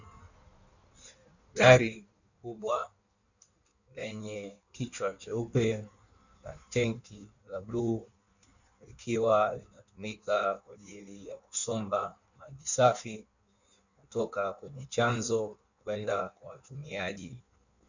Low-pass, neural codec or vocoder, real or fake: 7.2 kHz; codec, 16 kHz in and 24 kHz out, 1.1 kbps, FireRedTTS-2 codec; fake